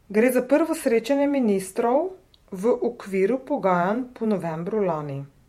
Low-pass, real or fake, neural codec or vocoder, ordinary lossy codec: 19.8 kHz; fake; vocoder, 48 kHz, 128 mel bands, Vocos; MP3, 64 kbps